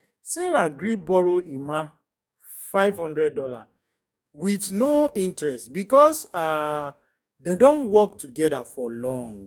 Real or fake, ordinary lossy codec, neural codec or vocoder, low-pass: fake; none; codec, 44.1 kHz, 2.6 kbps, DAC; 19.8 kHz